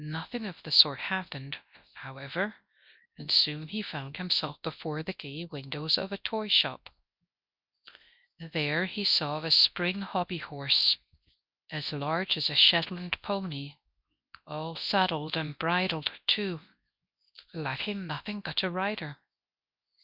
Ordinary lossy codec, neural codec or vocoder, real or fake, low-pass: Opus, 64 kbps; codec, 24 kHz, 0.9 kbps, WavTokenizer, large speech release; fake; 5.4 kHz